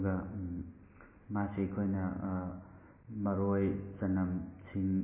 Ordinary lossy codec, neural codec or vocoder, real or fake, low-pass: MP3, 16 kbps; none; real; 3.6 kHz